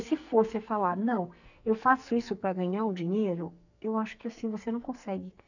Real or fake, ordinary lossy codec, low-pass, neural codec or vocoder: fake; none; 7.2 kHz; codec, 44.1 kHz, 2.6 kbps, SNAC